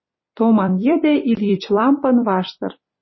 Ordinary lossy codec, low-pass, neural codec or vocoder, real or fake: MP3, 24 kbps; 7.2 kHz; vocoder, 44.1 kHz, 128 mel bands every 256 samples, BigVGAN v2; fake